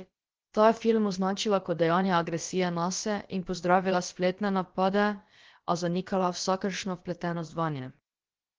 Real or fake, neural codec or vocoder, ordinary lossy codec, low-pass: fake; codec, 16 kHz, about 1 kbps, DyCAST, with the encoder's durations; Opus, 16 kbps; 7.2 kHz